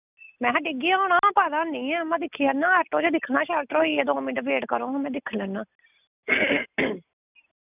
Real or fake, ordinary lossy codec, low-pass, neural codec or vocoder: real; none; 3.6 kHz; none